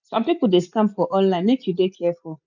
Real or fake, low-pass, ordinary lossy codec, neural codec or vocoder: real; 7.2 kHz; none; none